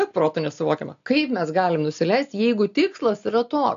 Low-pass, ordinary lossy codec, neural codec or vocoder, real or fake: 7.2 kHz; AAC, 64 kbps; none; real